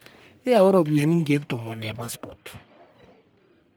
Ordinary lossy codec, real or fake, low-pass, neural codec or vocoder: none; fake; none; codec, 44.1 kHz, 1.7 kbps, Pupu-Codec